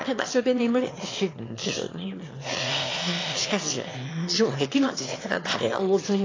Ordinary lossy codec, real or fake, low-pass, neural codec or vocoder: AAC, 32 kbps; fake; 7.2 kHz; autoencoder, 22.05 kHz, a latent of 192 numbers a frame, VITS, trained on one speaker